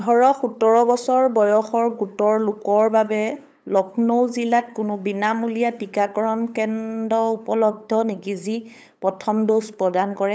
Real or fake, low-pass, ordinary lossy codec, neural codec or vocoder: fake; none; none; codec, 16 kHz, 8 kbps, FunCodec, trained on LibriTTS, 25 frames a second